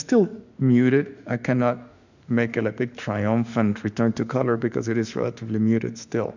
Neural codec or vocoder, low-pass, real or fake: autoencoder, 48 kHz, 32 numbers a frame, DAC-VAE, trained on Japanese speech; 7.2 kHz; fake